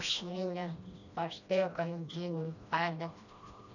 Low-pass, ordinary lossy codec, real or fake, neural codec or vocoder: 7.2 kHz; none; fake; codec, 16 kHz, 1 kbps, FreqCodec, smaller model